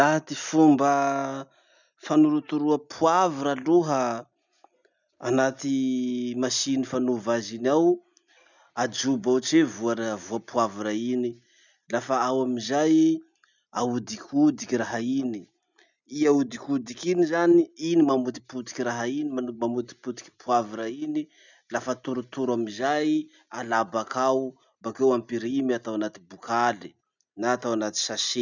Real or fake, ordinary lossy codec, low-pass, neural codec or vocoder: real; none; 7.2 kHz; none